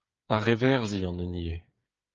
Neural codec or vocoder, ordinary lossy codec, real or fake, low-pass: codec, 16 kHz, 8 kbps, FreqCodec, smaller model; Opus, 24 kbps; fake; 7.2 kHz